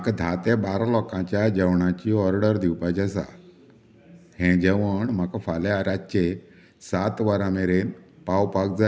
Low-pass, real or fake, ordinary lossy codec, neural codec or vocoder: none; real; none; none